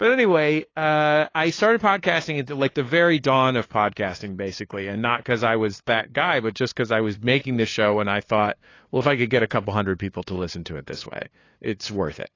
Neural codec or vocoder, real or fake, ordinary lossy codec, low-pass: codec, 16 kHz, 2 kbps, FunCodec, trained on LibriTTS, 25 frames a second; fake; AAC, 32 kbps; 7.2 kHz